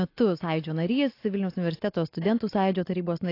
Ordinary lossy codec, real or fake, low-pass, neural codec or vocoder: AAC, 32 kbps; real; 5.4 kHz; none